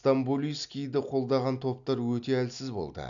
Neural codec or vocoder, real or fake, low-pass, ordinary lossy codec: none; real; 7.2 kHz; none